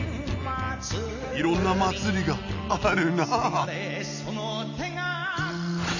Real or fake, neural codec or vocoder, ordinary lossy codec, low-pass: real; none; none; 7.2 kHz